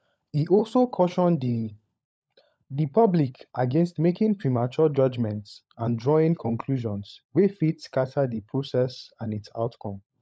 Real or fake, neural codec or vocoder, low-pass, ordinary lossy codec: fake; codec, 16 kHz, 16 kbps, FunCodec, trained on LibriTTS, 50 frames a second; none; none